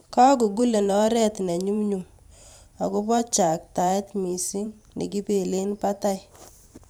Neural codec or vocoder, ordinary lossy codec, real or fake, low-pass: vocoder, 44.1 kHz, 128 mel bands every 512 samples, BigVGAN v2; none; fake; none